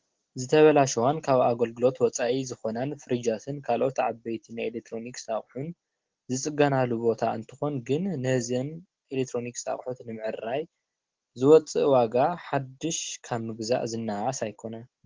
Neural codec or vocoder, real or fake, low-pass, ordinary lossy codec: none; real; 7.2 kHz; Opus, 16 kbps